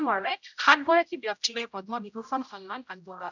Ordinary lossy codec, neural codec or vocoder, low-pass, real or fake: none; codec, 16 kHz, 0.5 kbps, X-Codec, HuBERT features, trained on general audio; 7.2 kHz; fake